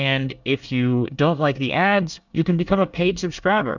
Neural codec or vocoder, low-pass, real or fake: codec, 24 kHz, 1 kbps, SNAC; 7.2 kHz; fake